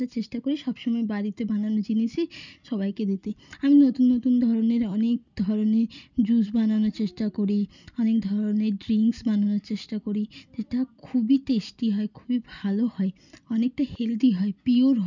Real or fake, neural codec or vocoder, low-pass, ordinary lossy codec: real; none; 7.2 kHz; none